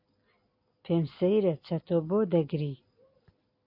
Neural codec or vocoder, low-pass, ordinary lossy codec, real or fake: none; 5.4 kHz; MP3, 32 kbps; real